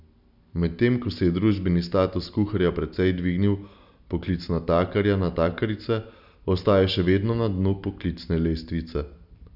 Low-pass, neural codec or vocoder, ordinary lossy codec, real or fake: 5.4 kHz; none; none; real